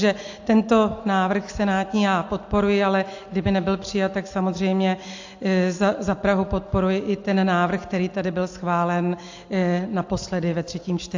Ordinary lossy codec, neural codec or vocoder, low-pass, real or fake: AAC, 48 kbps; none; 7.2 kHz; real